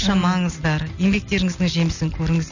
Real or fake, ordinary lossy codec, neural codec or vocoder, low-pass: real; none; none; 7.2 kHz